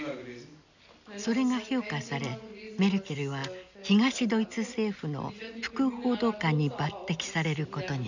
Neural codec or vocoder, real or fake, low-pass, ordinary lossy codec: none; real; 7.2 kHz; none